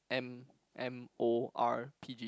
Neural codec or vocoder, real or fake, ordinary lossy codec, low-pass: none; real; none; none